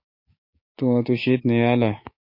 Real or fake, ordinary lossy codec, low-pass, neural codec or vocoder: fake; MP3, 32 kbps; 5.4 kHz; codec, 16 kHz, 6 kbps, DAC